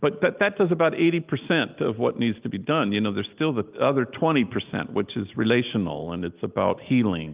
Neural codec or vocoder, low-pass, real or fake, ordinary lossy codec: none; 3.6 kHz; real; Opus, 24 kbps